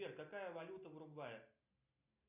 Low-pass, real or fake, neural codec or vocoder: 3.6 kHz; real; none